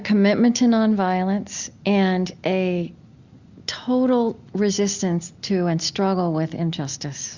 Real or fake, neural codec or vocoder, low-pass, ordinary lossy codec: real; none; 7.2 kHz; Opus, 64 kbps